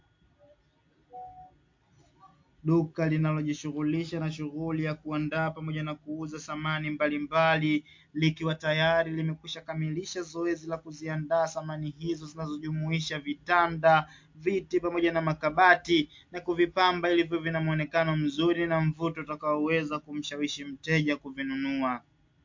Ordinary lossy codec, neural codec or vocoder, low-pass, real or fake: MP3, 48 kbps; none; 7.2 kHz; real